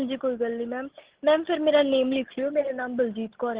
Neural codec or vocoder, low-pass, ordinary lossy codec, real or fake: none; 3.6 kHz; Opus, 16 kbps; real